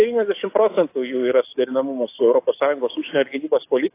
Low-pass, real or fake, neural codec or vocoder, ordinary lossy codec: 3.6 kHz; fake; codec, 44.1 kHz, 7.8 kbps, DAC; AAC, 24 kbps